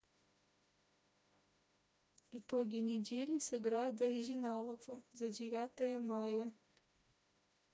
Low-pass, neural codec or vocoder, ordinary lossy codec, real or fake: none; codec, 16 kHz, 1 kbps, FreqCodec, smaller model; none; fake